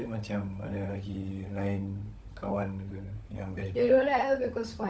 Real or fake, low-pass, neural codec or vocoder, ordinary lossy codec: fake; none; codec, 16 kHz, 16 kbps, FunCodec, trained on LibriTTS, 50 frames a second; none